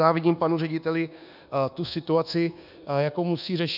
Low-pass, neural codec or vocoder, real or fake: 5.4 kHz; codec, 24 kHz, 1.2 kbps, DualCodec; fake